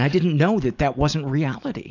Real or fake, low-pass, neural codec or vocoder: real; 7.2 kHz; none